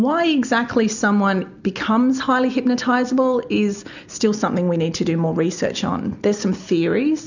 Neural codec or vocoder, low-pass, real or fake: none; 7.2 kHz; real